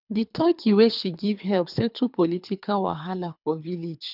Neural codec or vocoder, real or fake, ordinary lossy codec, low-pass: codec, 24 kHz, 3 kbps, HILCodec; fake; none; 5.4 kHz